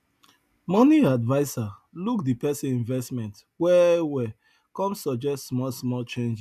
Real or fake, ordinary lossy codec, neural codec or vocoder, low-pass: real; none; none; 14.4 kHz